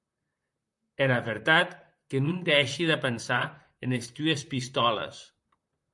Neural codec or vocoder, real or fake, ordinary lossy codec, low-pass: vocoder, 44.1 kHz, 128 mel bands, Pupu-Vocoder; fake; MP3, 96 kbps; 10.8 kHz